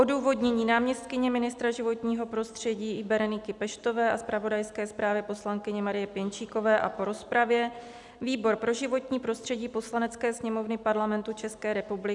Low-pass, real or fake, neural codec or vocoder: 10.8 kHz; real; none